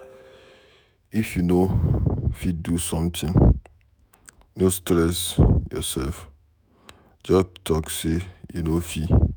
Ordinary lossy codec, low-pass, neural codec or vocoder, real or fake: none; none; autoencoder, 48 kHz, 128 numbers a frame, DAC-VAE, trained on Japanese speech; fake